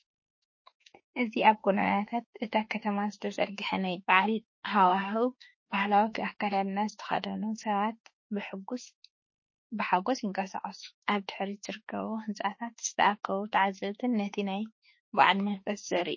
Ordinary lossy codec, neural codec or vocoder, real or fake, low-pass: MP3, 32 kbps; autoencoder, 48 kHz, 32 numbers a frame, DAC-VAE, trained on Japanese speech; fake; 7.2 kHz